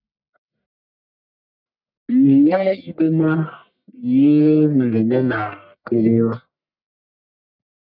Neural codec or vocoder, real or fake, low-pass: codec, 44.1 kHz, 1.7 kbps, Pupu-Codec; fake; 5.4 kHz